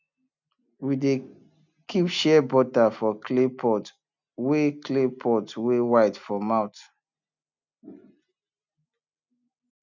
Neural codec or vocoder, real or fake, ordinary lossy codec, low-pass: none; real; none; 7.2 kHz